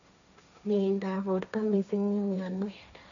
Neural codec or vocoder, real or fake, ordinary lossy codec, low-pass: codec, 16 kHz, 1.1 kbps, Voila-Tokenizer; fake; none; 7.2 kHz